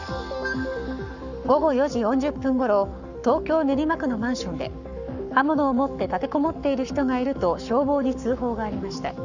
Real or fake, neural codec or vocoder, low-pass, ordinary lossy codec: fake; codec, 44.1 kHz, 7.8 kbps, Pupu-Codec; 7.2 kHz; none